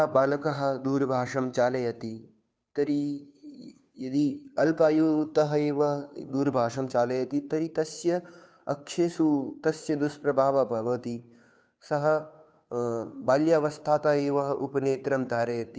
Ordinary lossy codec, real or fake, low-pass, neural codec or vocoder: none; fake; none; codec, 16 kHz, 2 kbps, FunCodec, trained on Chinese and English, 25 frames a second